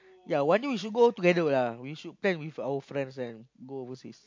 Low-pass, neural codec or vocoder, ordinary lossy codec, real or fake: 7.2 kHz; none; MP3, 48 kbps; real